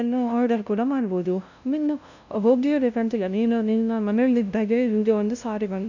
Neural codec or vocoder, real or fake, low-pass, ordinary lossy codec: codec, 16 kHz, 0.5 kbps, FunCodec, trained on LibriTTS, 25 frames a second; fake; 7.2 kHz; none